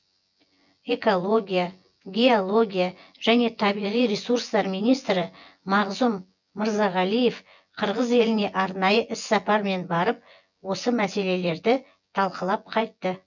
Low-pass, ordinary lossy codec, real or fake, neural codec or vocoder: 7.2 kHz; none; fake; vocoder, 24 kHz, 100 mel bands, Vocos